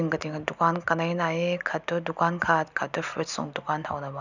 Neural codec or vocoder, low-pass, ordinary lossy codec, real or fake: none; 7.2 kHz; none; real